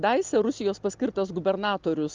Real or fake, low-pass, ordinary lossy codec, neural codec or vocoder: real; 7.2 kHz; Opus, 24 kbps; none